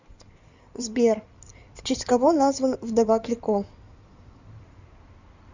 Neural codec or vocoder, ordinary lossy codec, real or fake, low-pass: codec, 16 kHz in and 24 kHz out, 2.2 kbps, FireRedTTS-2 codec; Opus, 64 kbps; fake; 7.2 kHz